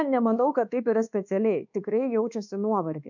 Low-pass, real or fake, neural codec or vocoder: 7.2 kHz; fake; codec, 24 kHz, 1.2 kbps, DualCodec